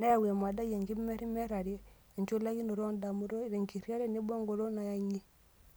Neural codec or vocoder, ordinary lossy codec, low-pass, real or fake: none; none; none; real